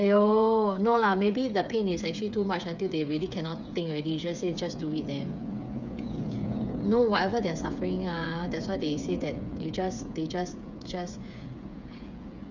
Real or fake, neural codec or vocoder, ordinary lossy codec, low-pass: fake; codec, 16 kHz, 8 kbps, FreqCodec, smaller model; none; 7.2 kHz